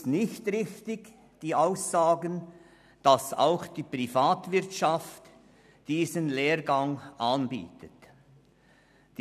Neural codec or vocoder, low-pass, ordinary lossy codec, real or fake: none; 14.4 kHz; none; real